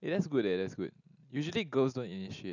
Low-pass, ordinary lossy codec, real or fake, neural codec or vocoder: 7.2 kHz; none; real; none